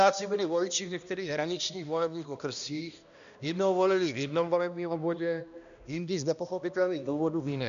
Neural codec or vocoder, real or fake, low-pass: codec, 16 kHz, 1 kbps, X-Codec, HuBERT features, trained on balanced general audio; fake; 7.2 kHz